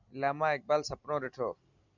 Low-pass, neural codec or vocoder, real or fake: 7.2 kHz; vocoder, 44.1 kHz, 128 mel bands every 256 samples, BigVGAN v2; fake